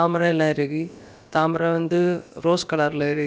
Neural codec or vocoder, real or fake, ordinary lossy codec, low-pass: codec, 16 kHz, about 1 kbps, DyCAST, with the encoder's durations; fake; none; none